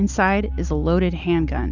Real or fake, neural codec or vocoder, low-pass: real; none; 7.2 kHz